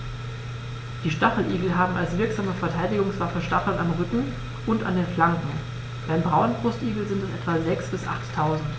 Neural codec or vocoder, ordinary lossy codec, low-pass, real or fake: none; none; none; real